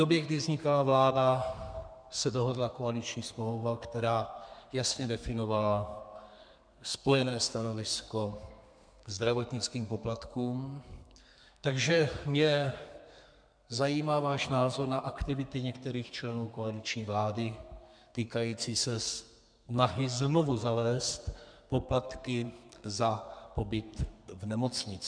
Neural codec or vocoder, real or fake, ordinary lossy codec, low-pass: codec, 32 kHz, 1.9 kbps, SNAC; fake; MP3, 96 kbps; 9.9 kHz